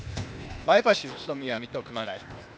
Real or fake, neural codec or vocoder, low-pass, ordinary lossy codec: fake; codec, 16 kHz, 0.8 kbps, ZipCodec; none; none